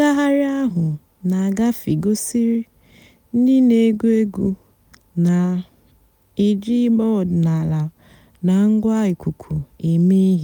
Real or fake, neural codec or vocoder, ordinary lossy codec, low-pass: real; none; none; none